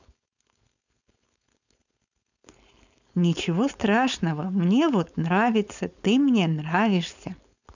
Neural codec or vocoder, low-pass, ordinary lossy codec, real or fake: codec, 16 kHz, 4.8 kbps, FACodec; 7.2 kHz; none; fake